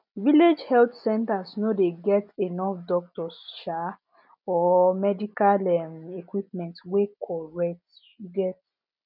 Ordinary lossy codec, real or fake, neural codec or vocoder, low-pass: none; real; none; 5.4 kHz